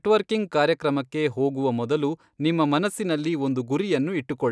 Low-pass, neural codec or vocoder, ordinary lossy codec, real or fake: none; none; none; real